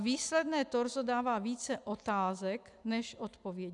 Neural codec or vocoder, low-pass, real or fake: none; 10.8 kHz; real